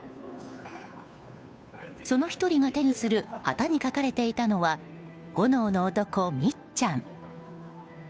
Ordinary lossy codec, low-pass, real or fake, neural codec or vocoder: none; none; fake; codec, 16 kHz, 2 kbps, FunCodec, trained on Chinese and English, 25 frames a second